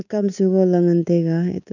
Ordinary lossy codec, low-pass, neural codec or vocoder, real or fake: none; 7.2 kHz; codec, 24 kHz, 3.1 kbps, DualCodec; fake